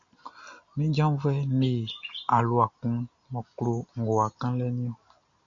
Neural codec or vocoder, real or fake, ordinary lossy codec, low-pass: none; real; MP3, 64 kbps; 7.2 kHz